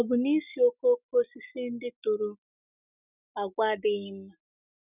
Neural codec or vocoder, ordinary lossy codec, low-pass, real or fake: none; none; 3.6 kHz; real